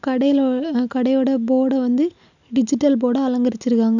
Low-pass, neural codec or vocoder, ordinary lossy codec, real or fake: 7.2 kHz; none; none; real